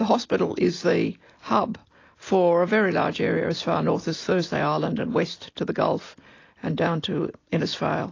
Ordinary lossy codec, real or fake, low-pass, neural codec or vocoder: AAC, 32 kbps; real; 7.2 kHz; none